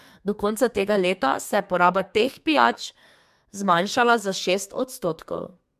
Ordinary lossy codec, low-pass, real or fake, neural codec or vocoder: MP3, 96 kbps; 14.4 kHz; fake; codec, 32 kHz, 1.9 kbps, SNAC